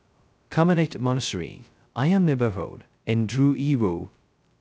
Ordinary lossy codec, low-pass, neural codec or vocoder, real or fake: none; none; codec, 16 kHz, 0.2 kbps, FocalCodec; fake